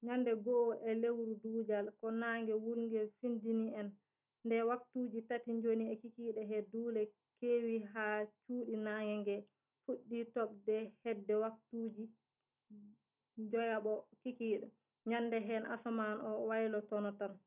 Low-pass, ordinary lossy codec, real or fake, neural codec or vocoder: 3.6 kHz; none; real; none